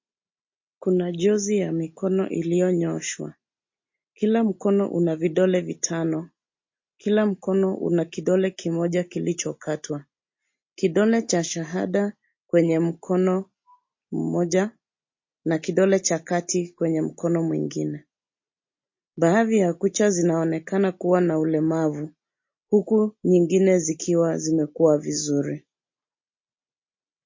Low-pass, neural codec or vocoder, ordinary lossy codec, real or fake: 7.2 kHz; none; MP3, 32 kbps; real